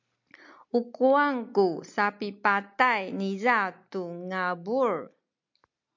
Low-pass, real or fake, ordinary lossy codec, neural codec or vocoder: 7.2 kHz; real; MP3, 64 kbps; none